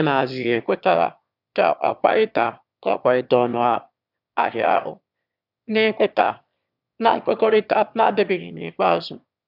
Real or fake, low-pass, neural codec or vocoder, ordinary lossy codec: fake; 5.4 kHz; autoencoder, 22.05 kHz, a latent of 192 numbers a frame, VITS, trained on one speaker; none